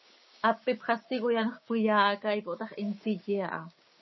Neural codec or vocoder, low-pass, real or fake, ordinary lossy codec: codec, 16 kHz, 6 kbps, DAC; 7.2 kHz; fake; MP3, 24 kbps